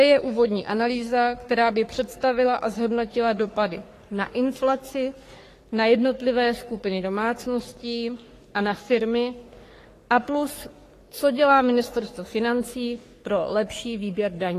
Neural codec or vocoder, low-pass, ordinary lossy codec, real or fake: codec, 44.1 kHz, 3.4 kbps, Pupu-Codec; 14.4 kHz; AAC, 48 kbps; fake